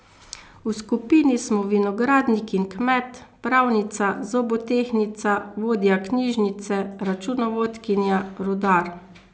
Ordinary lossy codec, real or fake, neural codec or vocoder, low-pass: none; real; none; none